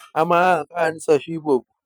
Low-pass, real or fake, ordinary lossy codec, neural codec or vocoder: none; fake; none; vocoder, 44.1 kHz, 128 mel bands every 512 samples, BigVGAN v2